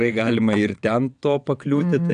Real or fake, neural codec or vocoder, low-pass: fake; vocoder, 22.05 kHz, 80 mel bands, WaveNeXt; 9.9 kHz